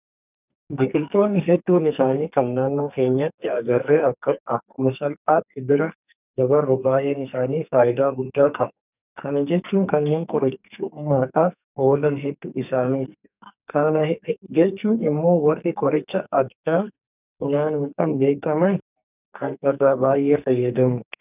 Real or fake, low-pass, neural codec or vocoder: fake; 3.6 kHz; codec, 44.1 kHz, 2.6 kbps, SNAC